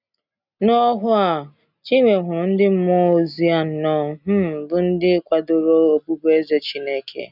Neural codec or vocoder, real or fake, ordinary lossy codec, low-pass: none; real; none; 5.4 kHz